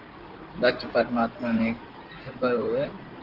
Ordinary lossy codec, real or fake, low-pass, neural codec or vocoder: Opus, 16 kbps; fake; 5.4 kHz; codec, 16 kHz in and 24 kHz out, 2.2 kbps, FireRedTTS-2 codec